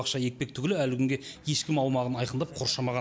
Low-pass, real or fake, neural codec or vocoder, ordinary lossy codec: none; real; none; none